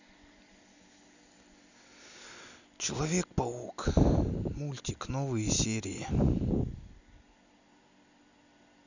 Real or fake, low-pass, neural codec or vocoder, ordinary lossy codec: real; 7.2 kHz; none; none